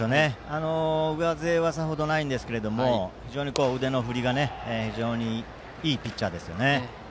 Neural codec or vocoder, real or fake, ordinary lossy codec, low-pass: none; real; none; none